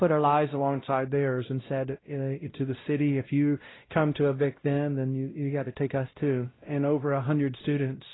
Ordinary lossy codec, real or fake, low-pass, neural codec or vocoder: AAC, 16 kbps; fake; 7.2 kHz; codec, 16 kHz, 0.5 kbps, X-Codec, WavLM features, trained on Multilingual LibriSpeech